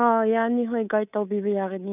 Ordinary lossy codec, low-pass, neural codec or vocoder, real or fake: none; 3.6 kHz; codec, 24 kHz, 3.1 kbps, DualCodec; fake